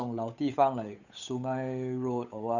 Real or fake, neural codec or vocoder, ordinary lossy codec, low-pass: fake; codec, 16 kHz, 8 kbps, FunCodec, trained on Chinese and English, 25 frames a second; none; 7.2 kHz